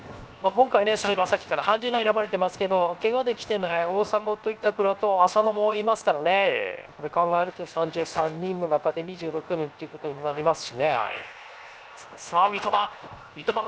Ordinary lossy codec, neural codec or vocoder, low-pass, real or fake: none; codec, 16 kHz, 0.7 kbps, FocalCodec; none; fake